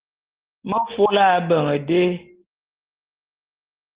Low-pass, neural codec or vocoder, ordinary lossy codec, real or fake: 3.6 kHz; none; Opus, 16 kbps; real